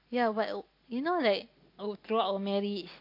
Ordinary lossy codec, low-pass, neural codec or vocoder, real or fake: MP3, 32 kbps; 5.4 kHz; none; real